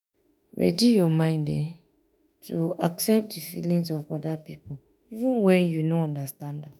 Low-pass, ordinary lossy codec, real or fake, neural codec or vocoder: none; none; fake; autoencoder, 48 kHz, 32 numbers a frame, DAC-VAE, trained on Japanese speech